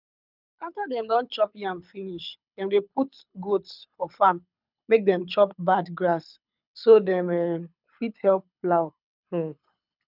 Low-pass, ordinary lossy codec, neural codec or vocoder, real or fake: 5.4 kHz; none; codec, 24 kHz, 6 kbps, HILCodec; fake